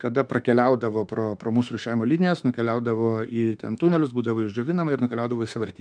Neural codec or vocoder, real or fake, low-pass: autoencoder, 48 kHz, 32 numbers a frame, DAC-VAE, trained on Japanese speech; fake; 9.9 kHz